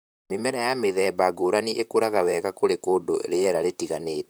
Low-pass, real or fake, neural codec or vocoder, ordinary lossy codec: none; fake; vocoder, 44.1 kHz, 128 mel bands, Pupu-Vocoder; none